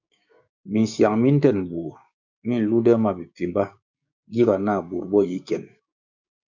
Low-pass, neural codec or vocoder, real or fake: 7.2 kHz; codec, 16 kHz, 6 kbps, DAC; fake